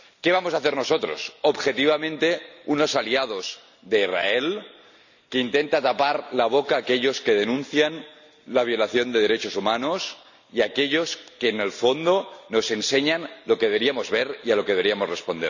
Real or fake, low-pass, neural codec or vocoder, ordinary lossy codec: real; 7.2 kHz; none; none